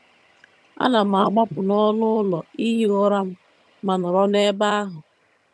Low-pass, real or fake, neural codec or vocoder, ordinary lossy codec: none; fake; vocoder, 22.05 kHz, 80 mel bands, HiFi-GAN; none